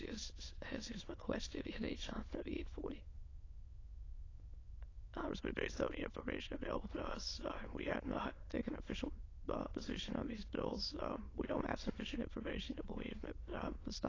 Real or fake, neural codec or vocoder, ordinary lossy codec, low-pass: fake; autoencoder, 22.05 kHz, a latent of 192 numbers a frame, VITS, trained on many speakers; AAC, 32 kbps; 7.2 kHz